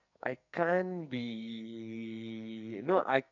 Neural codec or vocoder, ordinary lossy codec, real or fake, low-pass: codec, 44.1 kHz, 2.6 kbps, SNAC; none; fake; 7.2 kHz